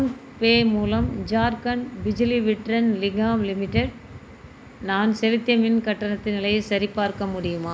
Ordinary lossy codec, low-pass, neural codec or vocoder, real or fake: none; none; none; real